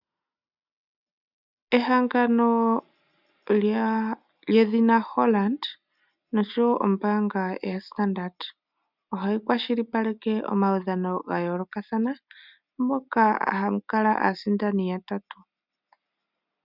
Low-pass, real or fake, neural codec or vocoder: 5.4 kHz; real; none